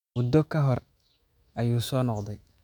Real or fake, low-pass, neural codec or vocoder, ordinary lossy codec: fake; 19.8 kHz; autoencoder, 48 kHz, 128 numbers a frame, DAC-VAE, trained on Japanese speech; none